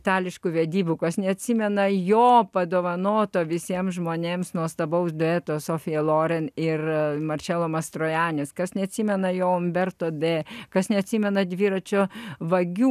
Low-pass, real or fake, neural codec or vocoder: 14.4 kHz; real; none